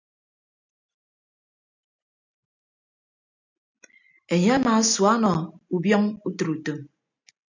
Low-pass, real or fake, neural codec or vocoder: 7.2 kHz; real; none